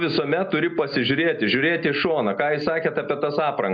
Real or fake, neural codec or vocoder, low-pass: real; none; 7.2 kHz